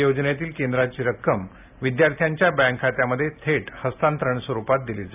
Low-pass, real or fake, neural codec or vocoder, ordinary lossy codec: 3.6 kHz; real; none; AAC, 32 kbps